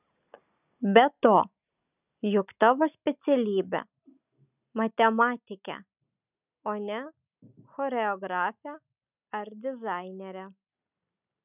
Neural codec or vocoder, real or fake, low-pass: none; real; 3.6 kHz